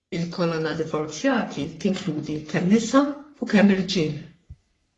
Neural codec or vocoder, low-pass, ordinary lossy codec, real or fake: codec, 44.1 kHz, 3.4 kbps, Pupu-Codec; 10.8 kHz; AAC, 32 kbps; fake